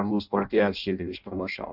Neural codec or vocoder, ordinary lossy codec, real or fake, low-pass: codec, 16 kHz in and 24 kHz out, 0.6 kbps, FireRedTTS-2 codec; AAC, 48 kbps; fake; 5.4 kHz